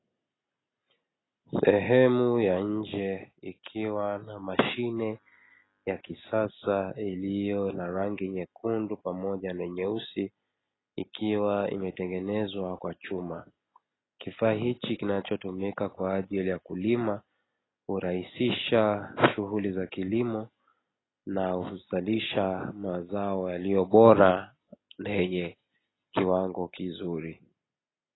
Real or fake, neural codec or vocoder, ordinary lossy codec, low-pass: real; none; AAC, 16 kbps; 7.2 kHz